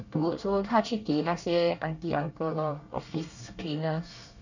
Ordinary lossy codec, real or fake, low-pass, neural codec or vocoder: none; fake; 7.2 kHz; codec, 24 kHz, 1 kbps, SNAC